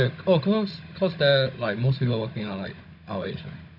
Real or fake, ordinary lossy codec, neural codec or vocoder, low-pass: fake; none; codec, 16 kHz, 16 kbps, FunCodec, trained on Chinese and English, 50 frames a second; 5.4 kHz